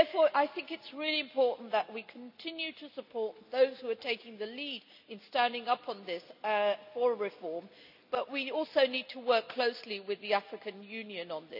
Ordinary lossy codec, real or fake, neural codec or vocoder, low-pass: none; real; none; 5.4 kHz